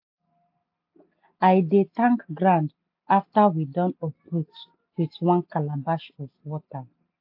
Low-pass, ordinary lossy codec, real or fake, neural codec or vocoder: 5.4 kHz; AAC, 48 kbps; real; none